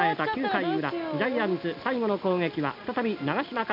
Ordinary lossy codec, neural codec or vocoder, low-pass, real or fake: none; none; 5.4 kHz; real